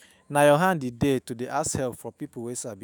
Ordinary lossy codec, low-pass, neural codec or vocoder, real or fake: none; none; autoencoder, 48 kHz, 128 numbers a frame, DAC-VAE, trained on Japanese speech; fake